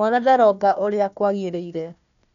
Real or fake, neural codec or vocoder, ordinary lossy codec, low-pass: fake; codec, 16 kHz, 1 kbps, FunCodec, trained on Chinese and English, 50 frames a second; none; 7.2 kHz